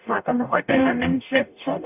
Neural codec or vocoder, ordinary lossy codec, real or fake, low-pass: codec, 44.1 kHz, 0.9 kbps, DAC; none; fake; 3.6 kHz